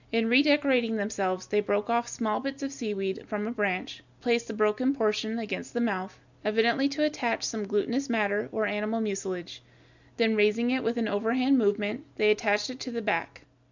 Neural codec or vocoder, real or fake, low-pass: none; real; 7.2 kHz